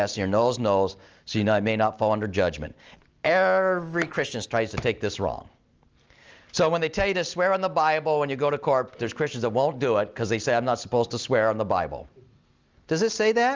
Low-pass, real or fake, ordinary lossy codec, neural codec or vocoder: 7.2 kHz; real; Opus, 24 kbps; none